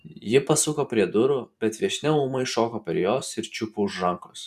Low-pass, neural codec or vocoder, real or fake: 14.4 kHz; vocoder, 44.1 kHz, 128 mel bands every 512 samples, BigVGAN v2; fake